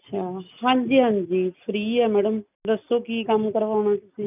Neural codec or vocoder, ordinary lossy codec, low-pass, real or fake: none; none; 3.6 kHz; real